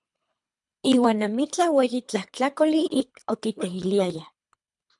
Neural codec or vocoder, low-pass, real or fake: codec, 24 kHz, 3 kbps, HILCodec; 10.8 kHz; fake